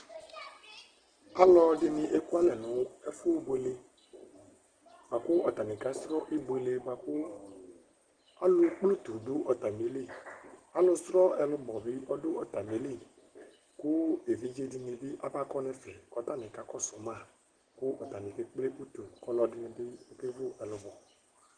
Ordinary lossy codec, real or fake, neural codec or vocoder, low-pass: Opus, 16 kbps; real; none; 9.9 kHz